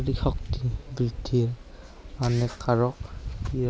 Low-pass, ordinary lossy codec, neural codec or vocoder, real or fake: none; none; none; real